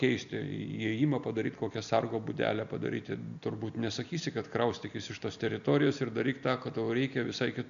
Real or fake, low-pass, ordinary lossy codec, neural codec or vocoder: real; 7.2 kHz; MP3, 96 kbps; none